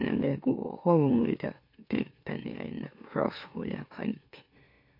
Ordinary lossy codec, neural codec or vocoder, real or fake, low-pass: MP3, 32 kbps; autoencoder, 44.1 kHz, a latent of 192 numbers a frame, MeloTTS; fake; 5.4 kHz